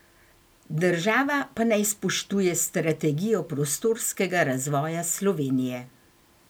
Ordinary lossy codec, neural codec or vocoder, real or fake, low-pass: none; none; real; none